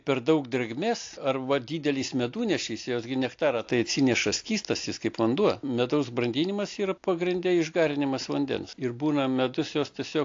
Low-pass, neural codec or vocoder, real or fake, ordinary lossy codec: 7.2 kHz; none; real; AAC, 48 kbps